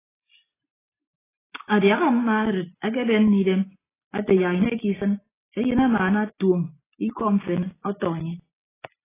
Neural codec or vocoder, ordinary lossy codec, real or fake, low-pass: none; AAC, 16 kbps; real; 3.6 kHz